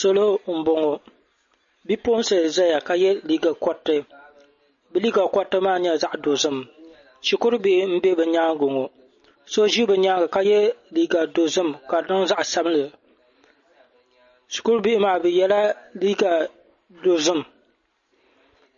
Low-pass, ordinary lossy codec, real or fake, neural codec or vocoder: 7.2 kHz; MP3, 32 kbps; real; none